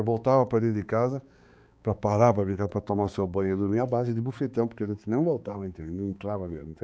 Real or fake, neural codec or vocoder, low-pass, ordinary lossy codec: fake; codec, 16 kHz, 4 kbps, X-Codec, HuBERT features, trained on balanced general audio; none; none